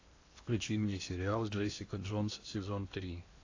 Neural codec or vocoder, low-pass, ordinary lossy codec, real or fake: codec, 16 kHz in and 24 kHz out, 0.8 kbps, FocalCodec, streaming, 65536 codes; 7.2 kHz; AAC, 32 kbps; fake